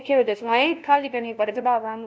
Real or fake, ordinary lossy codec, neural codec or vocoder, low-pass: fake; none; codec, 16 kHz, 0.5 kbps, FunCodec, trained on LibriTTS, 25 frames a second; none